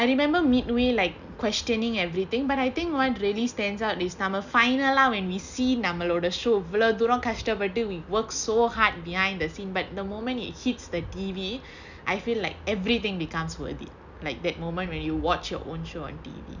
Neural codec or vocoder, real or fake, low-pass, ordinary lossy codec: none; real; 7.2 kHz; none